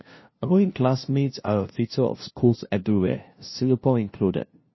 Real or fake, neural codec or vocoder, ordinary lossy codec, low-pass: fake; codec, 16 kHz, 0.5 kbps, FunCodec, trained on LibriTTS, 25 frames a second; MP3, 24 kbps; 7.2 kHz